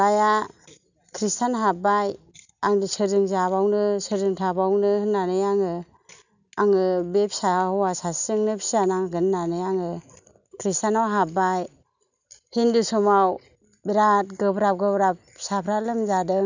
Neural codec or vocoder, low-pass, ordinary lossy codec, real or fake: none; 7.2 kHz; none; real